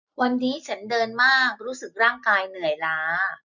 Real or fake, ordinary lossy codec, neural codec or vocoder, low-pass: real; none; none; 7.2 kHz